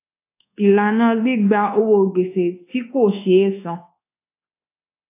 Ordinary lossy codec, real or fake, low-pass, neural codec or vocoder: AAC, 24 kbps; fake; 3.6 kHz; codec, 24 kHz, 1.2 kbps, DualCodec